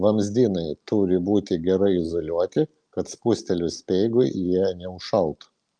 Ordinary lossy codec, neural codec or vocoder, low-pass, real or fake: Opus, 32 kbps; none; 9.9 kHz; real